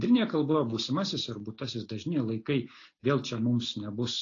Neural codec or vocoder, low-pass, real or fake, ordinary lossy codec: none; 7.2 kHz; real; AAC, 32 kbps